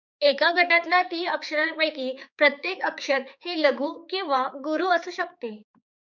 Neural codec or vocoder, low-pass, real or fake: codec, 16 kHz, 4 kbps, X-Codec, HuBERT features, trained on balanced general audio; 7.2 kHz; fake